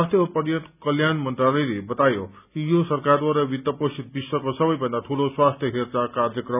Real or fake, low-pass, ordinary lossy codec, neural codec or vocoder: real; 3.6 kHz; none; none